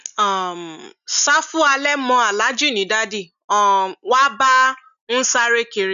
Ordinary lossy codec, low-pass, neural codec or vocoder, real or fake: none; 7.2 kHz; none; real